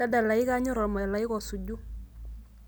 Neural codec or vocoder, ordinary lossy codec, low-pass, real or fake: none; none; none; real